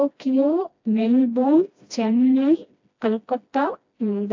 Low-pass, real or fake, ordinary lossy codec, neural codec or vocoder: 7.2 kHz; fake; AAC, 48 kbps; codec, 16 kHz, 1 kbps, FreqCodec, smaller model